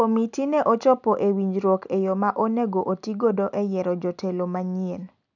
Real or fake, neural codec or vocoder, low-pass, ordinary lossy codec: real; none; 7.2 kHz; none